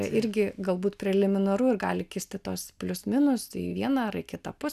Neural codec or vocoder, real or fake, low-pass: none; real; 14.4 kHz